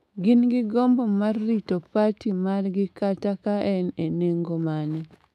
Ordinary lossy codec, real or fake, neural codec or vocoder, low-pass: none; fake; autoencoder, 48 kHz, 128 numbers a frame, DAC-VAE, trained on Japanese speech; 14.4 kHz